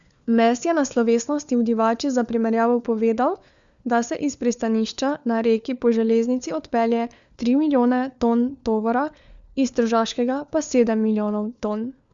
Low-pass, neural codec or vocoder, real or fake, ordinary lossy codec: 7.2 kHz; codec, 16 kHz, 4 kbps, FunCodec, trained on LibriTTS, 50 frames a second; fake; Opus, 64 kbps